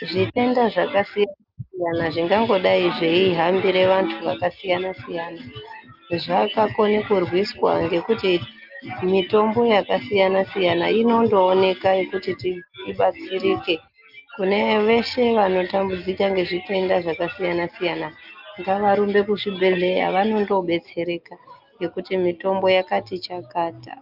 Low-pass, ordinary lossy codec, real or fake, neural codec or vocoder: 5.4 kHz; Opus, 24 kbps; real; none